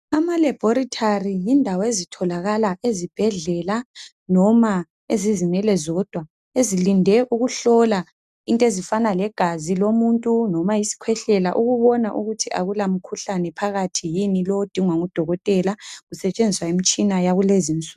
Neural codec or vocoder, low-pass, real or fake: none; 14.4 kHz; real